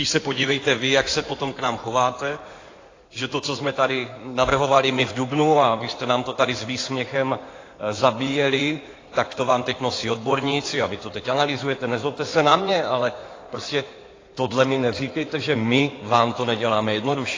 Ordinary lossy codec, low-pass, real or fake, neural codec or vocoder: AAC, 32 kbps; 7.2 kHz; fake; codec, 16 kHz in and 24 kHz out, 2.2 kbps, FireRedTTS-2 codec